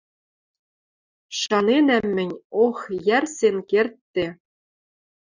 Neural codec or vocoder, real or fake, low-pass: none; real; 7.2 kHz